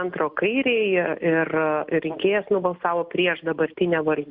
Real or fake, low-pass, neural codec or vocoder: real; 5.4 kHz; none